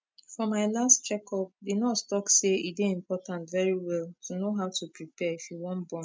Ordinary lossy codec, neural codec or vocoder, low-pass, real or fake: none; none; none; real